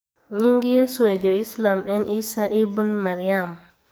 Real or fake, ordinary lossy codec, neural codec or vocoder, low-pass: fake; none; codec, 44.1 kHz, 2.6 kbps, SNAC; none